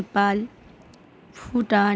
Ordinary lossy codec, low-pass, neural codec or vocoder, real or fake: none; none; none; real